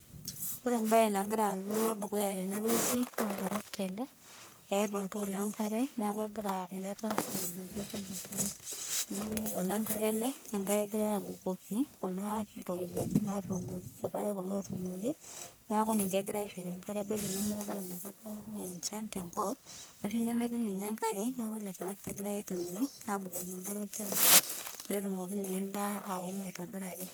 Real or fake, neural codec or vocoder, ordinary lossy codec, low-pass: fake; codec, 44.1 kHz, 1.7 kbps, Pupu-Codec; none; none